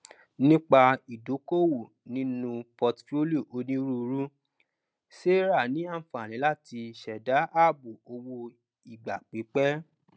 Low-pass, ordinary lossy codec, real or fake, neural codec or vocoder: none; none; real; none